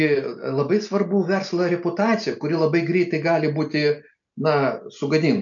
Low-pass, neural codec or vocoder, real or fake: 7.2 kHz; none; real